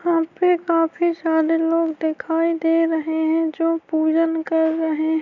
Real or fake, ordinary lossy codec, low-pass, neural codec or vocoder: real; none; 7.2 kHz; none